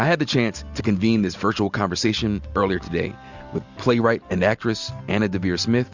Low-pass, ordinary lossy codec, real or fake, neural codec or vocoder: 7.2 kHz; Opus, 64 kbps; real; none